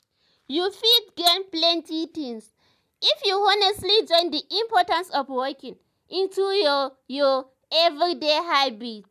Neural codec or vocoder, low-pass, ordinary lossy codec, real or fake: none; 14.4 kHz; none; real